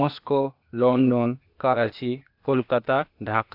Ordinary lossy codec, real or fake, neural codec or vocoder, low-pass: AAC, 48 kbps; fake; codec, 16 kHz, 0.8 kbps, ZipCodec; 5.4 kHz